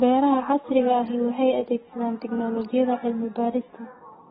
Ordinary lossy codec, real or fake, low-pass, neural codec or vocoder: AAC, 16 kbps; fake; 19.8 kHz; codec, 44.1 kHz, 7.8 kbps, DAC